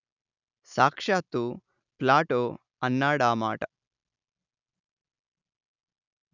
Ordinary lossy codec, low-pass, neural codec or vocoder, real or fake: none; 7.2 kHz; none; real